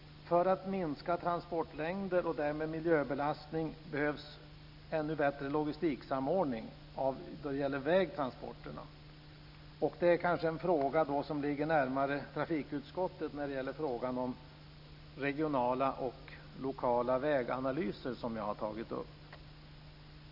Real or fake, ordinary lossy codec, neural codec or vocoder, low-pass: real; none; none; 5.4 kHz